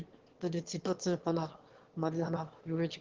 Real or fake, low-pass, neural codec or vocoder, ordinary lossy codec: fake; 7.2 kHz; autoencoder, 22.05 kHz, a latent of 192 numbers a frame, VITS, trained on one speaker; Opus, 16 kbps